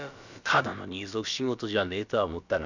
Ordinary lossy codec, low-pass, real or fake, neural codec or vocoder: none; 7.2 kHz; fake; codec, 16 kHz, about 1 kbps, DyCAST, with the encoder's durations